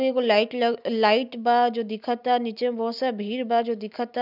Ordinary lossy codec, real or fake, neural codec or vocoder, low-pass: none; real; none; 5.4 kHz